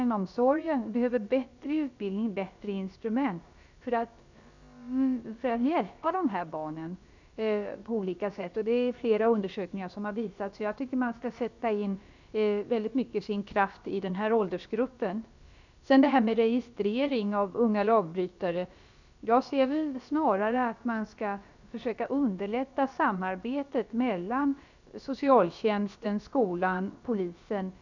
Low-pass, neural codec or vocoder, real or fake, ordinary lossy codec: 7.2 kHz; codec, 16 kHz, about 1 kbps, DyCAST, with the encoder's durations; fake; none